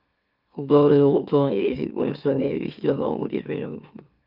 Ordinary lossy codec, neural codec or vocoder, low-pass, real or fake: Opus, 24 kbps; autoencoder, 44.1 kHz, a latent of 192 numbers a frame, MeloTTS; 5.4 kHz; fake